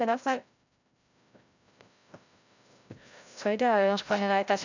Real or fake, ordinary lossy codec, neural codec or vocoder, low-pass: fake; none; codec, 16 kHz, 0.5 kbps, FreqCodec, larger model; 7.2 kHz